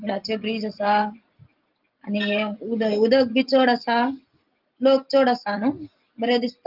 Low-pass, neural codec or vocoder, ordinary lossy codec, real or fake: 5.4 kHz; none; Opus, 32 kbps; real